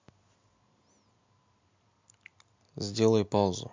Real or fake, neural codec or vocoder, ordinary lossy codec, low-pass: real; none; MP3, 64 kbps; 7.2 kHz